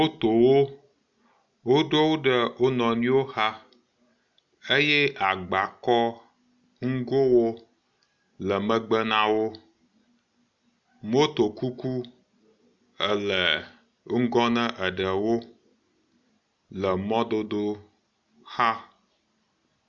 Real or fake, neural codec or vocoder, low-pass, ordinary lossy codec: real; none; 7.2 kHz; MP3, 96 kbps